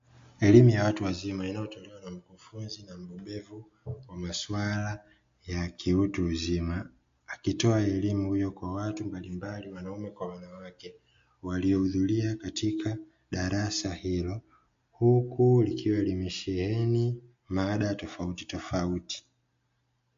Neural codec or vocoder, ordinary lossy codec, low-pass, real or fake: none; MP3, 48 kbps; 7.2 kHz; real